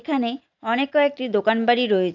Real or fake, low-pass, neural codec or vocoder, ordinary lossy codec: real; 7.2 kHz; none; none